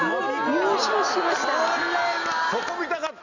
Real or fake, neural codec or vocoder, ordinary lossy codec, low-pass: real; none; none; 7.2 kHz